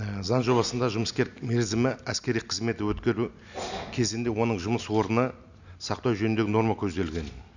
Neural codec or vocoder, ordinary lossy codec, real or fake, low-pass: none; none; real; 7.2 kHz